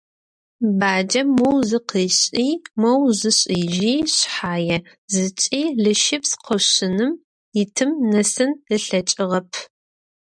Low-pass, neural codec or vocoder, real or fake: 9.9 kHz; none; real